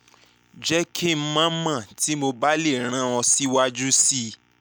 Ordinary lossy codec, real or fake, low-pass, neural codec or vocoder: none; real; none; none